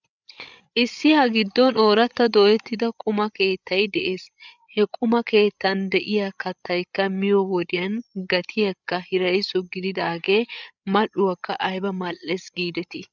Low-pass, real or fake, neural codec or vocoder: 7.2 kHz; fake; codec, 16 kHz, 8 kbps, FreqCodec, larger model